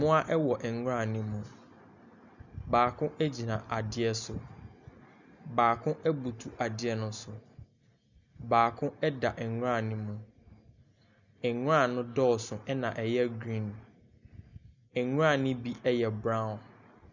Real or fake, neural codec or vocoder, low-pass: real; none; 7.2 kHz